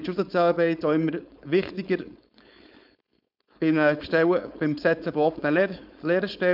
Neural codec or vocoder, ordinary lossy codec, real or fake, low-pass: codec, 16 kHz, 4.8 kbps, FACodec; none; fake; 5.4 kHz